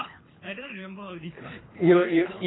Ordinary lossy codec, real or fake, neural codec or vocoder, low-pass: AAC, 16 kbps; fake; codec, 24 kHz, 3 kbps, HILCodec; 7.2 kHz